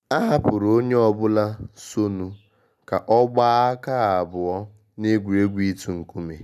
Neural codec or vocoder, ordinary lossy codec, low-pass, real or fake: none; none; 14.4 kHz; real